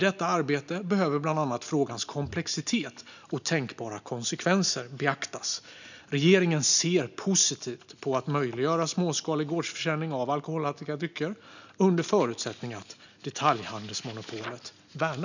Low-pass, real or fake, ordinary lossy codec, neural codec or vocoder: 7.2 kHz; real; none; none